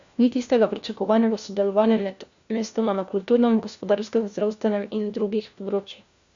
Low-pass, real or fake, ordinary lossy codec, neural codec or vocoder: 7.2 kHz; fake; Opus, 64 kbps; codec, 16 kHz, 1 kbps, FunCodec, trained on LibriTTS, 50 frames a second